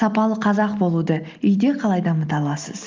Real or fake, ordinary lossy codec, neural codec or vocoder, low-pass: real; Opus, 32 kbps; none; 7.2 kHz